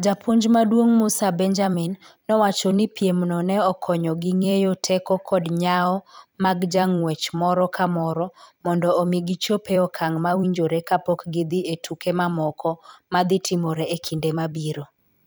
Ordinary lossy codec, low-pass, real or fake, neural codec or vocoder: none; none; fake; vocoder, 44.1 kHz, 128 mel bands every 256 samples, BigVGAN v2